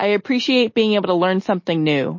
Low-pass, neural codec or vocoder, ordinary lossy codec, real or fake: 7.2 kHz; none; MP3, 32 kbps; real